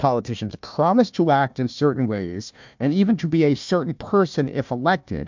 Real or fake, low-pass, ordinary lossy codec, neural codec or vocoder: fake; 7.2 kHz; MP3, 64 kbps; codec, 16 kHz, 1 kbps, FunCodec, trained on Chinese and English, 50 frames a second